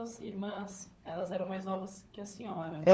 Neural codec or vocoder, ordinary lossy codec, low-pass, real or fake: codec, 16 kHz, 4 kbps, FunCodec, trained on LibriTTS, 50 frames a second; none; none; fake